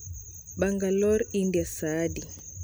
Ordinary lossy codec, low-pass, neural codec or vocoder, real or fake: none; none; none; real